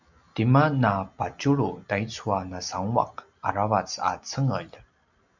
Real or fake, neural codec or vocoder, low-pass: real; none; 7.2 kHz